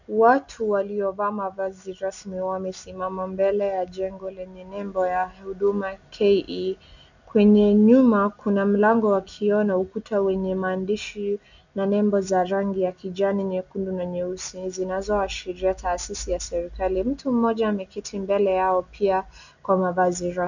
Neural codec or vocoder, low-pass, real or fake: none; 7.2 kHz; real